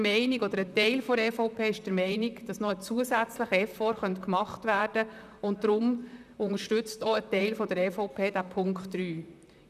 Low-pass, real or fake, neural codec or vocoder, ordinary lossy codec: 14.4 kHz; fake; vocoder, 44.1 kHz, 128 mel bands, Pupu-Vocoder; none